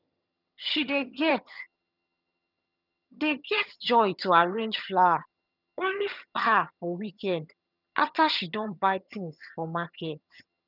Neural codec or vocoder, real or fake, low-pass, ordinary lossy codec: vocoder, 22.05 kHz, 80 mel bands, HiFi-GAN; fake; 5.4 kHz; none